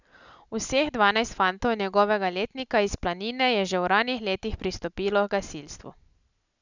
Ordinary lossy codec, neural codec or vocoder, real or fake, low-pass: none; none; real; 7.2 kHz